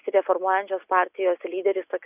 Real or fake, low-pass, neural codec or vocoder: real; 3.6 kHz; none